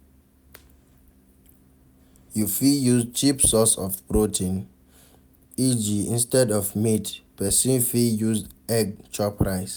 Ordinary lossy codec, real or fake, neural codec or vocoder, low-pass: none; real; none; none